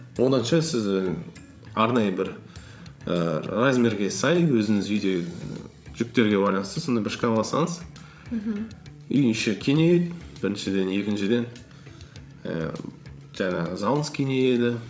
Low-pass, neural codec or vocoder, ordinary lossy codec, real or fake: none; codec, 16 kHz, 16 kbps, FreqCodec, larger model; none; fake